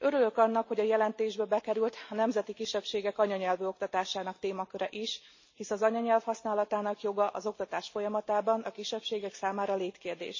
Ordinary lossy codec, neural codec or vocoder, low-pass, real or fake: MP3, 32 kbps; none; 7.2 kHz; real